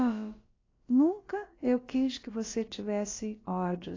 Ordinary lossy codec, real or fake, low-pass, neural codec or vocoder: AAC, 32 kbps; fake; 7.2 kHz; codec, 16 kHz, about 1 kbps, DyCAST, with the encoder's durations